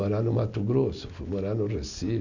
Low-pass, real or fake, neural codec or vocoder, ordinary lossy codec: 7.2 kHz; real; none; none